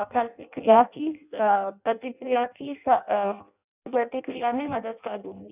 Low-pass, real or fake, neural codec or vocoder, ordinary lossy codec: 3.6 kHz; fake; codec, 16 kHz in and 24 kHz out, 0.6 kbps, FireRedTTS-2 codec; none